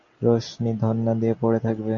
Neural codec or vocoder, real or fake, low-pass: none; real; 7.2 kHz